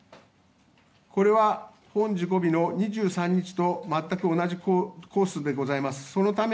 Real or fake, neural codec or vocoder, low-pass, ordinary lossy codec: real; none; none; none